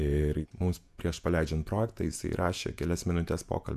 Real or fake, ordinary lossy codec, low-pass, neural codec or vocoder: fake; MP3, 96 kbps; 14.4 kHz; vocoder, 48 kHz, 128 mel bands, Vocos